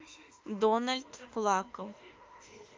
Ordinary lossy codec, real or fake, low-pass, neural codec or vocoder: Opus, 24 kbps; fake; 7.2 kHz; autoencoder, 48 kHz, 32 numbers a frame, DAC-VAE, trained on Japanese speech